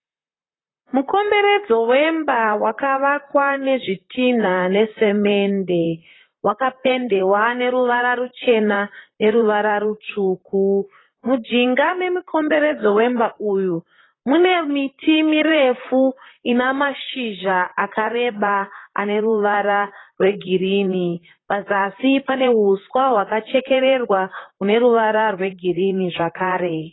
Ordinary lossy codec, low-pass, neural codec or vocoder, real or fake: AAC, 16 kbps; 7.2 kHz; vocoder, 44.1 kHz, 128 mel bands, Pupu-Vocoder; fake